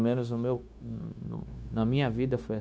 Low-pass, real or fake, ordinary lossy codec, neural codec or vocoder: none; fake; none; codec, 16 kHz, 0.9 kbps, LongCat-Audio-Codec